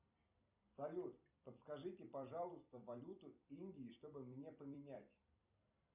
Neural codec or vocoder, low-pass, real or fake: vocoder, 44.1 kHz, 128 mel bands every 256 samples, BigVGAN v2; 3.6 kHz; fake